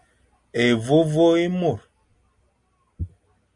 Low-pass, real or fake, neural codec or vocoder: 10.8 kHz; real; none